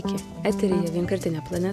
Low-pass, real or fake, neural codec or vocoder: 14.4 kHz; real; none